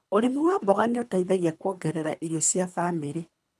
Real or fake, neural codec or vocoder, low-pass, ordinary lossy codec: fake; codec, 24 kHz, 3 kbps, HILCodec; none; none